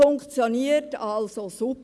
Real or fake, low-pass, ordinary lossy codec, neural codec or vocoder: real; none; none; none